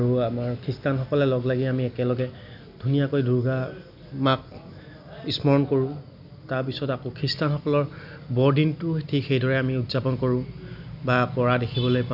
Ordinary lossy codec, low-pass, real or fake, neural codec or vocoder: none; 5.4 kHz; real; none